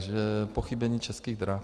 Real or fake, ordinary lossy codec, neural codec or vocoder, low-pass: real; Opus, 32 kbps; none; 10.8 kHz